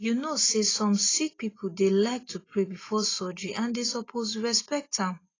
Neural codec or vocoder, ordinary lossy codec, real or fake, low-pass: none; AAC, 32 kbps; real; 7.2 kHz